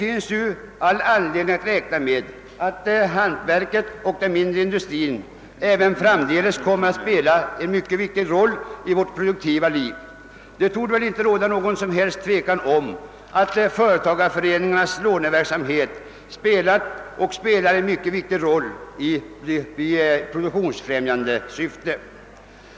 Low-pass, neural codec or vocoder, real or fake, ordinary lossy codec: none; none; real; none